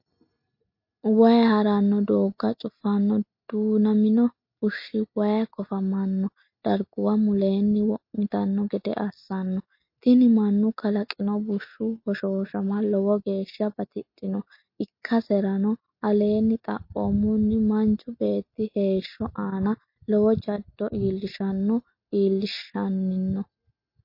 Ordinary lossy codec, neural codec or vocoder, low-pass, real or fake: MP3, 32 kbps; none; 5.4 kHz; real